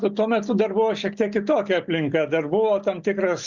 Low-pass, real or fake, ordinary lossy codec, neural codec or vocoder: 7.2 kHz; real; Opus, 64 kbps; none